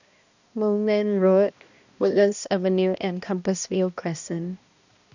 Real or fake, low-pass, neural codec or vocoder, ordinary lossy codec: fake; 7.2 kHz; codec, 16 kHz, 1 kbps, X-Codec, HuBERT features, trained on balanced general audio; none